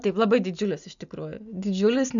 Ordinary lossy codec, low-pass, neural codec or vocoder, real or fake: MP3, 96 kbps; 7.2 kHz; none; real